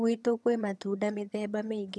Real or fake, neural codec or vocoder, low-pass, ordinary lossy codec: fake; vocoder, 22.05 kHz, 80 mel bands, HiFi-GAN; none; none